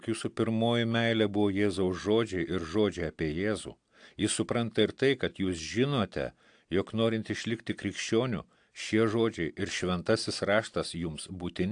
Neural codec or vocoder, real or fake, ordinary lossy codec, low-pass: none; real; AAC, 64 kbps; 9.9 kHz